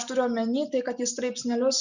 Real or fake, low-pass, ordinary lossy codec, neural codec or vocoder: real; 7.2 kHz; Opus, 64 kbps; none